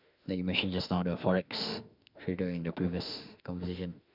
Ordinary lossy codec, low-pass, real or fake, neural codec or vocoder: none; 5.4 kHz; fake; autoencoder, 48 kHz, 32 numbers a frame, DAC-VAE, trained on Japanese speech